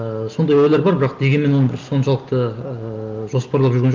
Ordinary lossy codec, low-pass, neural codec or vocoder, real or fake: Opus, 24 kbps; 7.2 kHz; none; real